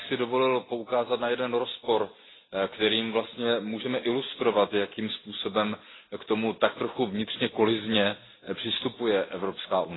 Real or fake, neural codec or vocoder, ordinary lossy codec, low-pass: real; none; AAC, 16 kbps; 7.2 kHz